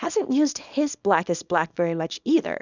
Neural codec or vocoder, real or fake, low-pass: codec, 24 kHz, 0.9 kbps, WavTokenizer, small release; fake; 7.2 kHz